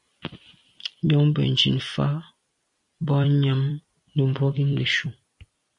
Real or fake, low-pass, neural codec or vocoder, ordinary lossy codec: fake; 10.8 kHz; vocoder, 44.1 kHz, 128 mel bands every 256 samples, BigVGAN v2; MP3, 48 kbps